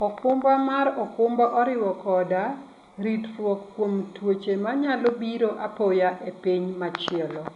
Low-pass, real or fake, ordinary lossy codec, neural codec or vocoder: 10.8 kHz; real; none; none